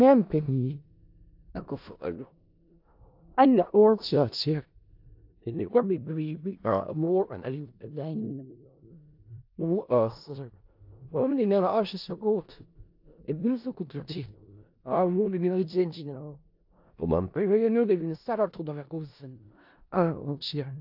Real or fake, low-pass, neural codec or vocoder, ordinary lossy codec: fake; 5.4 kHz; codec, 16 kHz in and 24 kHz out, 0.4 kbps, LongCat-Audio-Codec, four codebook decoder; AAC, 48 kbps